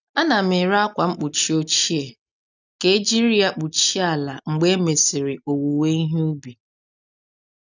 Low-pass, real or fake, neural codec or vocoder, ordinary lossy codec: 7.2 kHz; real; none; none